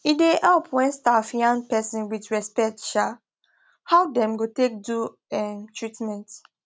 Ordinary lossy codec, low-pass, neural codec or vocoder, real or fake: none; none; none; real